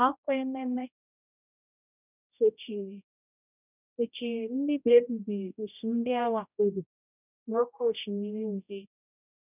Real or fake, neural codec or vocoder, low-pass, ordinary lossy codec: fake; codec, 16 kHz, 0.5 kbps, X-Codec, HuBERT features, trained on general audio; 3.6 kHz; none